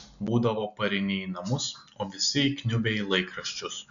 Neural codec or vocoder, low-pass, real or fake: none; 7.2 kHz; real